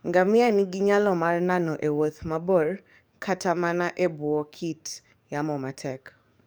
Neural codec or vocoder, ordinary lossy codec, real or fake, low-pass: codec, 44.1 kHz, 7.8 kbps, DAC; none; fake; none